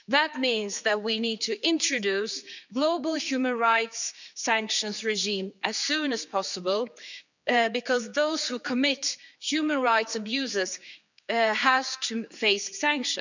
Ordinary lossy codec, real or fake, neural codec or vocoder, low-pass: none; fake; codec, 16 kHz, 4 kbps, X-Codec, HuBERT features, trained on general audio; 7.2 kHz